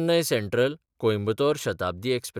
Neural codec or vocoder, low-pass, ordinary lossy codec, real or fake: none; 19.8 kHz; none; real